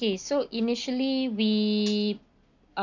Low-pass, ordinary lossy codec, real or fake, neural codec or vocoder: 7.2 kHz; none; real; none